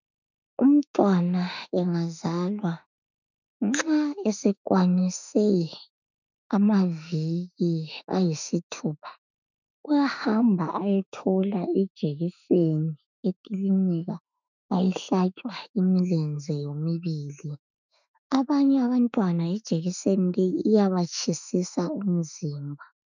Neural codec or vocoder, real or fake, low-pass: autoencoder, 48 kHz, 32 numbers a frame, DAC-VAE, trained on Japanese speech; fake; 7.2 kHz